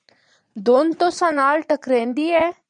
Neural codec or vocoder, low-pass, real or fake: vocoder, 22.05 kHz, 80 mel bands, Vocos; 9.9 kHz; fake